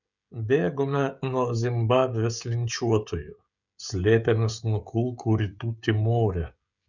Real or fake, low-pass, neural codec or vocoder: fake; 7.2 kHz; codec, 16 kHz, 16 kbps, FreqCodec, smaller model